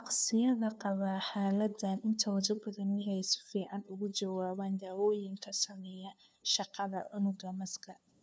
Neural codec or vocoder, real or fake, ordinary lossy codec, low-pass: codec, 16 kHz, 2 kbps, FunCodec, trained on LibriTTS, 25 frames a second; fake; none; none